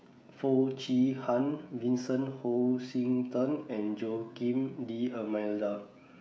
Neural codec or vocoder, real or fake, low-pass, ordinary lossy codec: codec, 16 kHz, 16 kbps, FreqCodec, smaller model; fake; none; none